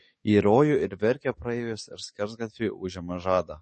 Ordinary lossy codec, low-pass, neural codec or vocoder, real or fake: MP3, 32 kbps; 10.8 kHz; codec, 44.1 kHz, 7.8 kbps, DAC; fake